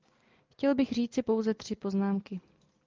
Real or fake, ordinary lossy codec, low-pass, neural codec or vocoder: real; Opus, 24 kbps; 7.2 kHz; none